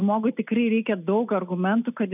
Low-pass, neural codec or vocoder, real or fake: 3.6 kHz; none; real